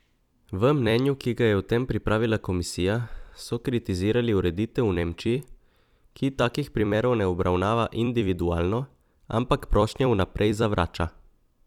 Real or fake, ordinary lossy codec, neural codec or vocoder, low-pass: fake; none; vocoder, 44.1 kHz, 128 mel bands every 256 samples, BigVGAN v2; 19.8 kHz